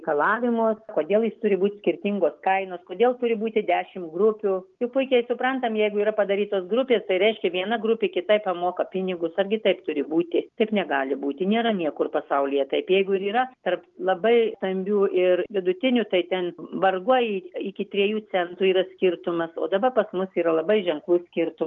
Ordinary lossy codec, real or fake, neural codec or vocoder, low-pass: Opus, 32 kbps; real; none; 7.2 kHz